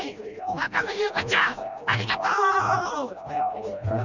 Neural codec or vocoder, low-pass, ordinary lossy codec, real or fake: codec, 16 kHz, 1 kbps, FreqCodec, smaller model; 7.2 kHz; none; fake